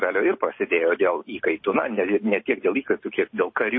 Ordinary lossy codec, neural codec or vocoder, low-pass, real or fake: MP3, 24 kbps; none; 7.2 kHz; real